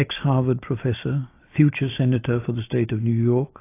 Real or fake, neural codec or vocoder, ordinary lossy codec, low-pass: real; none; AAC, 24 kbps; 3.6 kHz